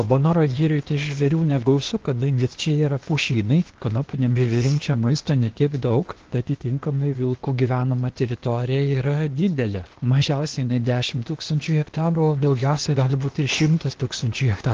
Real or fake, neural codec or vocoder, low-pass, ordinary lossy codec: fake; codec, 16 kHz, 0.8 kbps, ZipCodec; 7.2 kHz; Opus, 16 kbps